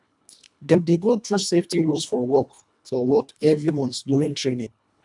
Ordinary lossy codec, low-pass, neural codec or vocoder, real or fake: none; 10.8 kHz; codec, 24 kHz, 1.5 kbps, HILCodec; fake